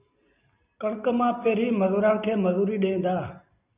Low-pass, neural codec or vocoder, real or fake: 3.6 kHz; none; real